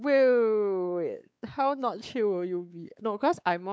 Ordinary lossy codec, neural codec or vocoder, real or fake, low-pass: none; codec, 16 kHz, 4 kbps, X-Codec, WavLM features, trained on Multilingual LibriSpeech; fake; none